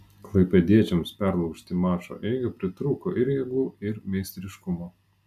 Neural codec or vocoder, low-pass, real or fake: none; 14.4 kHz; real